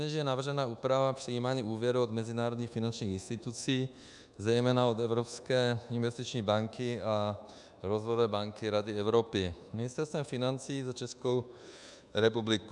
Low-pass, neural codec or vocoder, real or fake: 10.8 kHz; codec, 24 kHz, 1.2 kbps, DualCodec; fake